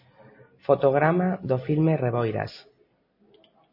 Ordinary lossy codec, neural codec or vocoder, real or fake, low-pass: MP3, 24 kbps; none; real; 5.4 kHz